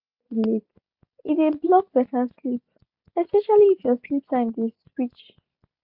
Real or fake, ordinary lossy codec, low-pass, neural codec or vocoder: real; MP3, 48 kbps; 5.4 kHz; none